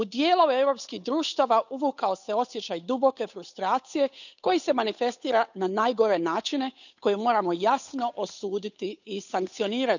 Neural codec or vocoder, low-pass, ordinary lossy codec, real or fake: codec, 16 kHz, 8 kbps, FunCodec, trained on Chinese and English, 25 frames a second; 7.2 kHz; none; fake